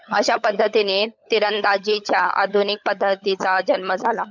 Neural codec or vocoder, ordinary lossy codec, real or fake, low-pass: codec, 16 kHz, 16 kbps, FunCodec, trained on LibriTTS, 50 frames a second; MP3, 64 kbps; fake; 7.2 kHz